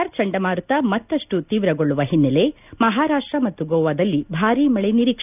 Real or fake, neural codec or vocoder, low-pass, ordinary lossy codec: real; none; 3.6 kHz; none